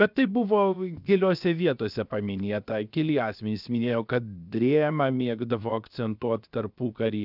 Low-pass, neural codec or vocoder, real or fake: 5.4 kHz; codec, 16 kHz, 0.7 kbps, FocalCodec; fake